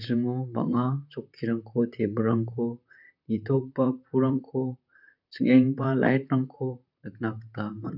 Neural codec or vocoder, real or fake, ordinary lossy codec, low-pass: vocoder, 44.1 kHz, 128 mel bands, Pupu-Vocoder; fake; none; 5.4 kHz